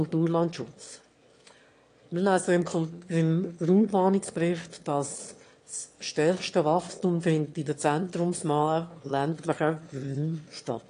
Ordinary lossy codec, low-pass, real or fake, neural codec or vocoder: AAC, 48 kbps; 9.9 kHz; fake; autoencoder, 22.05 kHz, a latent of 192 numbers a frame, VITS, trained on one speaker